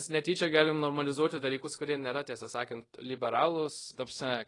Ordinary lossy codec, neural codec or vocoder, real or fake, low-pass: AAC, 32 kbps; codec, 24 kHz, 0.5 kbps, DualCodec; fake; 10.8 kHz